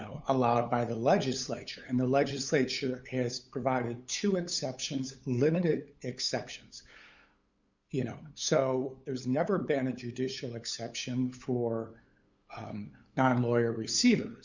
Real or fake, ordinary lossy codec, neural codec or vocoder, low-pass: fake; Opus, 64 kbps; codec, 16 kHz, 8 kbps, FunCodec, trained on LibriTTS, 25 frames a second; 7.2 kHz